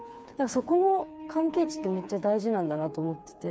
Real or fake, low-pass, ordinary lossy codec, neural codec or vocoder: fake; none; none; codec, 16 kHz, 4 kbps, FreqCodec, smaller model